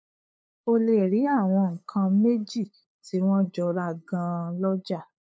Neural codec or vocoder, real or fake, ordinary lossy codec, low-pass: codec, 16 kHz, 8 kbps, FunCodec, trained on LibriTTS, 25 frames a second; fake; none; none